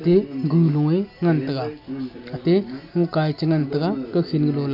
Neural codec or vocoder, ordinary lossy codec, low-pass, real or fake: none; none; 5.4 kHz; real